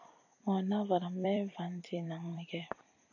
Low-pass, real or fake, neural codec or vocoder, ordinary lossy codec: 7.2 kHz; fake; vocoder, 44.1 kHz, 128 mel bands every 256 samples, BigVGAN v2; AAC, 48 kbps